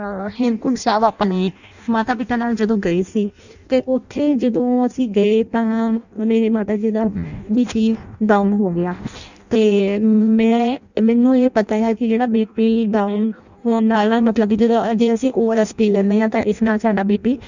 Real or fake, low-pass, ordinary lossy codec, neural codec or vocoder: fake; 7.2 kHz; none; codec, 16 kHz in and 24 kHz out, 0.6 kbps, FireRedTTS-2 codec